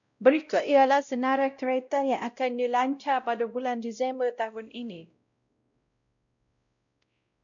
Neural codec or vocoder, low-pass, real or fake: codec, 16 kHz, 0.5 kbps, X-Codec, WavLM features, trained on Multilingual LibriSpeech; 7.2 kHz; fake